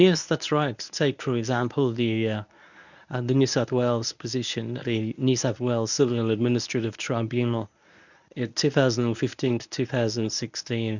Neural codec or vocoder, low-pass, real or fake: codec, 24 kHz, 0.9 kbps, WavTokenizer, medium speech release version 1; 7.2 kHz; fake